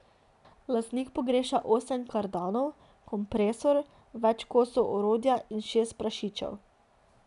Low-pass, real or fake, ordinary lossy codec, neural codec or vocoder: 10.8 kHz; real; none; none